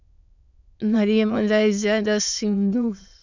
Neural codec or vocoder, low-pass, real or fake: autoencoder, 22.05 kHz, a latent of 192 numbers a frame, VITS, trained on many speakers; 7.2 kHz; fake